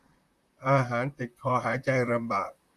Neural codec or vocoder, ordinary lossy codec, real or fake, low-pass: vocoder, 44.1 kHz, 128 mel bands, Pupu-Vocoder; Opus, 64 kbps; fake; 14.4 kHz